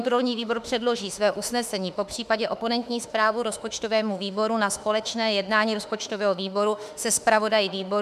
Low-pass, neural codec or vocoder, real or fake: 14.4 kHz; autoencoder, 48 kHz, 32 numbers a frame, DAC-VAE, trained on Japanese speech; fake